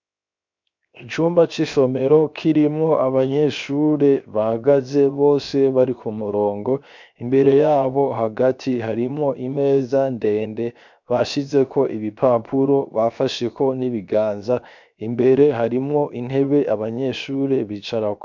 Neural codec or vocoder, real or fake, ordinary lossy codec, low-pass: codec, 16 kHz, 0.7 kbps, FocalCodec; fake; MP3, 64 kbps; 7.2 kHz